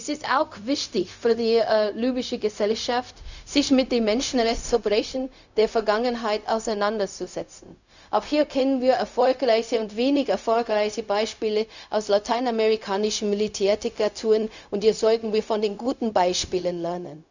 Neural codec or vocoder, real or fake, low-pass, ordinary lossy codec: codec, 16 kHz, 0.4 kbps, LongCat-Audio-Codec; fake; 7.2 kHz; none